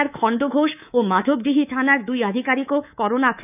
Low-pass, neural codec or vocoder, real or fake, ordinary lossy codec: 3.6 kHz; codec, 24 kHz, 3.1 kbps, DualCodec; fake; none